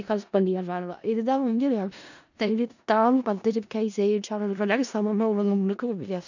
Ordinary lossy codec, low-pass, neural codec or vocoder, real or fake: none; 7.2 kHz; codec, 16 kHz in and 24 kHz out, 0.4 kbps, LongCat-Audio-Codec, four codebook decoder; fake